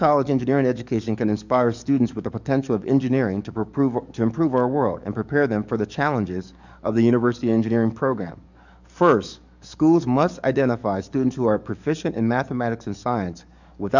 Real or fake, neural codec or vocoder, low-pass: fake; codec, 44.1 kHz, 7.8 kbps, DAC; 7.2 kHz